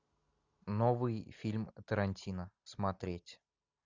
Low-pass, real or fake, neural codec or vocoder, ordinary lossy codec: 7.2 kHz; real; none; Opus, 64 kbps